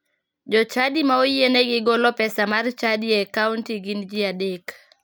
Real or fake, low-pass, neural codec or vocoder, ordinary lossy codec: real; none; none; none